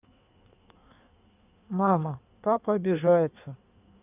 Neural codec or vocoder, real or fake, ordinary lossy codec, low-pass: codec, 16 kHz in and 24 kHz out, 1.1 kbps, FireRedTTS-2 codec; fake; none; 3.6 kHz